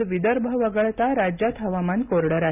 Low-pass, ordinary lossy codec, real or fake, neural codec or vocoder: 3.6 kHz; none; real; none